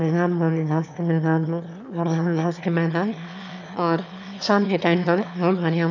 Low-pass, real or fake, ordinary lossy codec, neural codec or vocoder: 7.2 kHz; fake; none; autoencoder, 22.05 kHz, a latent of 192 numbers a frame, VITS, trained on one speaker